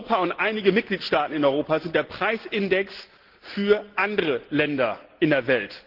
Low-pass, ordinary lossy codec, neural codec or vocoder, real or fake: 5.4 kHz; Opus, 16 kbps; none; real